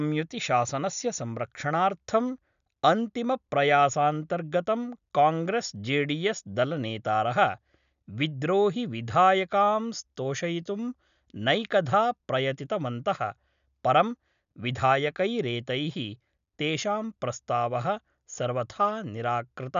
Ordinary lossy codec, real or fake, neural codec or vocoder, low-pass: none; real; none; 7.2 kHz